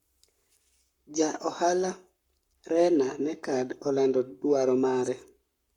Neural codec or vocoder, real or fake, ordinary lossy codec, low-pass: codec, 44.1 kHz, 7.8 kbps, Pupu-Codec; fake; Opus, 64 kbps; 19.8 kHz